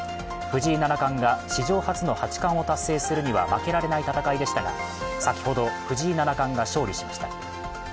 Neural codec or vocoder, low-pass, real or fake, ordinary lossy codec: none; none; real; none